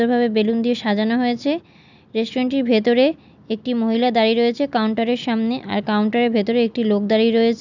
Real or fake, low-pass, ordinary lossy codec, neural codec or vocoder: real; 7.2 kHz; none; none